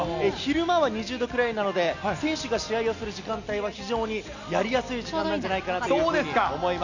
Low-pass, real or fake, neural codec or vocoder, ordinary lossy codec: 7.2 kHz; real; none; none